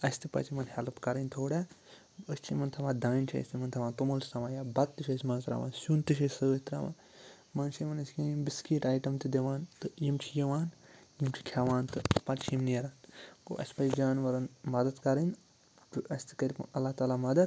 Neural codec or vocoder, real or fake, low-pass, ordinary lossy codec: none; real; none; none